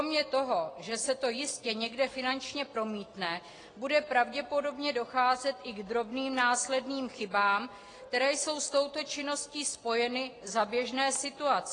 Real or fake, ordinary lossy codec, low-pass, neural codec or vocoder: real; AAC, 32 kbps; 9.9 kHz; none